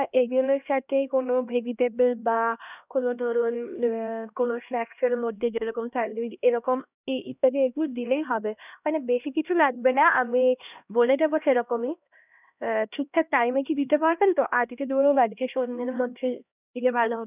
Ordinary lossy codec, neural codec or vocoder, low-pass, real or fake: none; codec, 16 kHz, 1 kbps, X-Codec, HuBERT features, trained on LibriSpeech; 3.6 kHz; fake